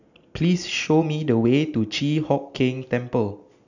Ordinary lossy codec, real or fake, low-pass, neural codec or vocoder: none; real; 7.2 kHz; none